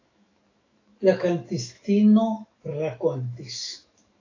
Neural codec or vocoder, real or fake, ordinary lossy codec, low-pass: autoencoder, 48 kHz, 128 numbers a frame, DAC-VAE, trained on Japanese speech; fake; AAC, 32 kbps; 7.2 kHz